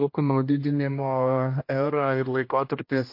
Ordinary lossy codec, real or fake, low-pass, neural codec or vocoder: MP3, 32 kbps; fake; 5.4 kHz; codec, 16 kHz, 1 kbps, X-Codec, HuBERT features, trained on general audio